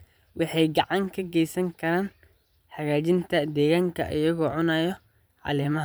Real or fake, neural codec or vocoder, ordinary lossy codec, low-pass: fake; vocoder, 44.1 kHz, 128 mel bands every 512 samples, BigVGAN v2; none; none